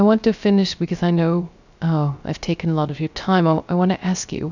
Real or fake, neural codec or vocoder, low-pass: fake; codec, 16 kHz, 0.3 kbps, FocalCodec; 7.2 kHz